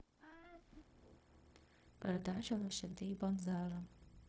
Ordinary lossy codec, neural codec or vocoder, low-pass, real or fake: none; codec, 16 kHz, 0.4 kbps, LongCat-Audio-Codec; none; fake